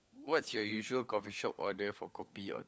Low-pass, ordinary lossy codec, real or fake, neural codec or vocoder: none; none; fake; codec, 16 kHz, 4 kbps, FunCodec, trained on LibriTTS, 50 frames a second